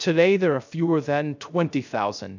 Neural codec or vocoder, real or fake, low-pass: codec, 16 kHz, 0.3 kbps, FocalCodec; fake; 7.2 kHz